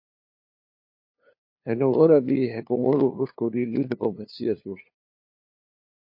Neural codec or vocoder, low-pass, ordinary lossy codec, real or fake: codec, 16 kHz, 2 kbps, FunCodec, trained on LibriTTS, 25 frames a second; 5.4 kHz; MP3, 32 kbps; fake